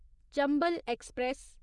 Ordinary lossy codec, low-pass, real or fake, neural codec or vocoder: none; 10.8 kHz; fake; vocoder, 24 kHz, 100 mel bands, Vocos